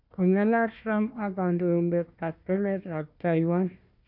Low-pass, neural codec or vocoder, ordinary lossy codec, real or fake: 5.4 kHz; codec, 16 kHz, 1 kbps, FunCodec, trained on Chinese and English, 50 frames a second; none; fake